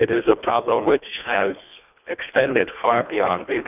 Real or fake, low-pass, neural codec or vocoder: fake; 3.6 kHz; codec, 24 kHz, 1.5 kbps, HILCodec